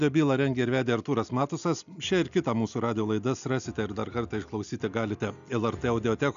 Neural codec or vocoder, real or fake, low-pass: none; real; 7.2 kHz